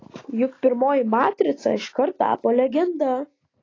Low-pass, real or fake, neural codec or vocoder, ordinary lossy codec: 7.2 kHz; real; none; AAC, 32 kbps